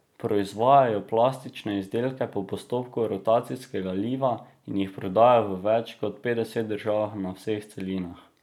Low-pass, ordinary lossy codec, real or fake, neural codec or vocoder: 19.8 kHz; none; real; none